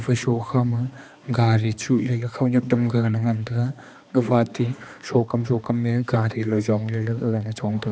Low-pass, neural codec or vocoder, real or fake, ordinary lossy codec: none; codec, 16 kHz, 2 kbps, X-Codec, HuBERT features, trained on balanced general audio; fake; none